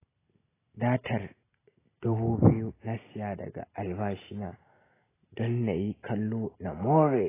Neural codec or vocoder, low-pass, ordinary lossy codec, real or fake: none; 3.6 kHz; AAC, 16 kbps; real